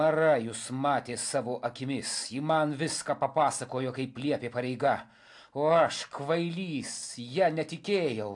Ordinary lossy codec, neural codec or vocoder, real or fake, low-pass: AAC, 64 kbps; none; real; 10.8 kHz